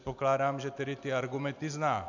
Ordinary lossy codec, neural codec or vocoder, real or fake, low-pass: MP3, 64 kbps; none; real; 7.2 kHz